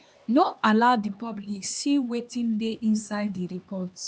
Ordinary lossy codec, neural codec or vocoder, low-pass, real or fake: none; codec, 16 kHz, 2 kbps, X-Codec, HuBERT features, trained on LibriSpeech; none; fake